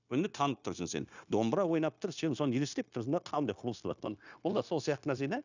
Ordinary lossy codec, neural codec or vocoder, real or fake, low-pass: none; codec, 16 kHz, 0.9 kbps, LongCat-Audio-Codec; fake; 7.2 kHz